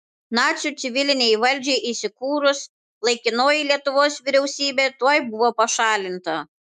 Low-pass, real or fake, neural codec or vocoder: 14.4 kHz; fake; autoencoder, 48 kHz, 128 numbers a frame, DAC-VAE, trained on Japanese speech